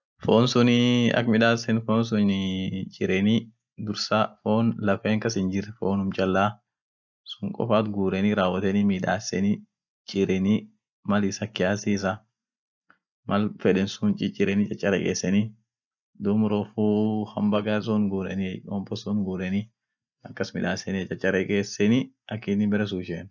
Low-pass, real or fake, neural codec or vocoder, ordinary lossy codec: 7.2 kHz; real; none; none